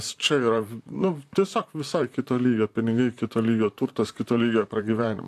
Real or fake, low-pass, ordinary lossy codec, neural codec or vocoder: fake; 14.4 kHz; AAC, 64 kbps; vocoder, 44.1 kHz, 128 mel bands, Pupu-Vocoder